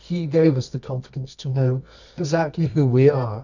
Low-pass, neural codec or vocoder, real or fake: 7.2 kHz; codec, 24 kHz, 0.9 kbps, WavTokenizer, medium music audio release; fake